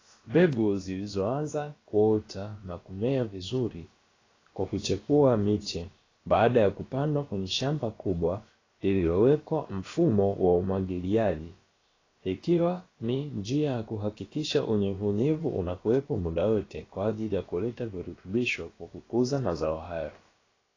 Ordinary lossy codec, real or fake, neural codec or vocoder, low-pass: AAC, 32 kbps; fake; codec, 16 kHz, about 1 kbps, DyCAST, with the encoder's durations; 7.2 kHz